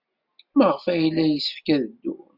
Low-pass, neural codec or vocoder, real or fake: 5.4 kHz; none; real